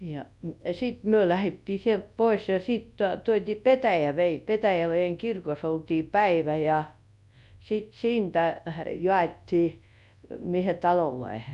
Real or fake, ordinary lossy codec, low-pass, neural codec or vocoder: fake; MP3, 64 kbps; 10.8 kHz; codec, 24 kHz, 0.9 kbps, WavTokenizer, large speech release